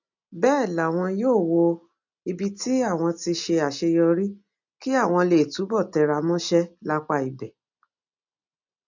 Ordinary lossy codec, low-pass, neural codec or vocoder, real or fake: none; 7.2 kHz; none; real